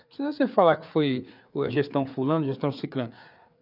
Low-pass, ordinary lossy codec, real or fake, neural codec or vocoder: 5.4 kHz; none; fake; codec, 16 kHz, 4 kbps, FreqCodec, larger model